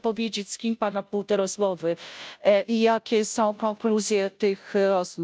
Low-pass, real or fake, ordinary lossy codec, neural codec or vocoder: none; fake; none; codec, 16 kHz, 0.5 kbps, FunCodec, trained on Chinese and English, 25 frames a second